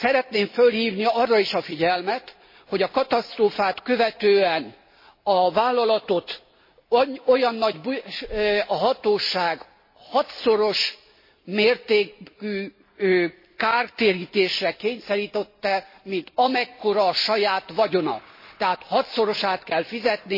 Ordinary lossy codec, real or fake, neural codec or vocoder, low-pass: MP3, 24 kbps; real; none; 5.4 kHz